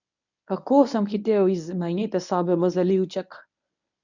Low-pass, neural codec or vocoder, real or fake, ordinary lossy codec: 7.2 kHz; codec, 24 kHz, 0.9 kbps, WavTokenizer, medium speech release version 1; fake; none